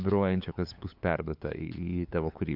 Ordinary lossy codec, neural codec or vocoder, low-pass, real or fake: MP3, 48 kbps; codec, 16 kHz, 8 kbps, FunCodec, trained on LibriTTS, 25 frames a second; 5.4 kHz; fake